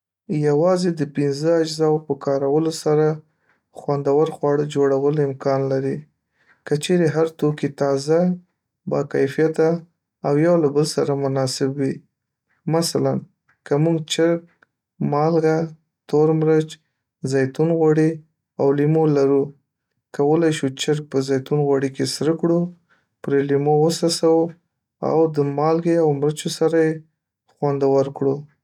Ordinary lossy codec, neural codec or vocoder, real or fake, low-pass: none; none; real; 19.8 kHz